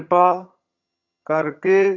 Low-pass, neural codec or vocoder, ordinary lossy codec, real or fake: 7.2 kHz; vocoder, 22.05 kHz, 80 mel bands, HiFi-GAN; none; fake